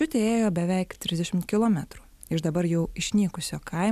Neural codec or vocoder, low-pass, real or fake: none; 14.4 kHz; real